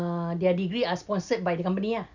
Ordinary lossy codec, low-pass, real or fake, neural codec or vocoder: none; 7.2 kHz; real; none